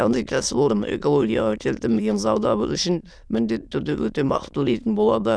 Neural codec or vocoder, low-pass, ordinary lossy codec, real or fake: autoencoder, 22.05 kHz, a latent of 192 numbers a frame, VITS, trained on many speakers; none; none; fake